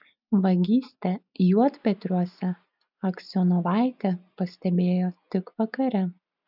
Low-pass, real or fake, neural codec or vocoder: 5.4 kHz; real; none